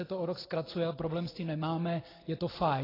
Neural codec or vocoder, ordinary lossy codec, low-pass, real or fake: none; AAC, 24 kbps; 5.4 kHz; real